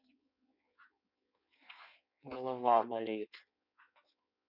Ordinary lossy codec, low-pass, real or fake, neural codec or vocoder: none; 5.4 kHz; fake; codec, 16 kHz in and 24 kHz out, 1.1 kbps, FireRedTTS-2 codec